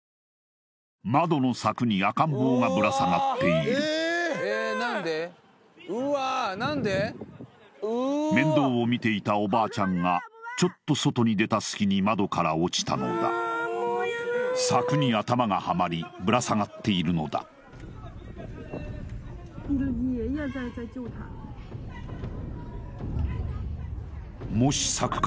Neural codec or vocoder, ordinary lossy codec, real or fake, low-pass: none; none; real; none